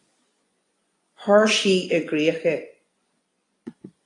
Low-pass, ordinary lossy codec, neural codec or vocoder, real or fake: 10.8 kHz; AAC, 32 kbps; vocoder, 24 kHz, 100 mel bands, Vocos; fake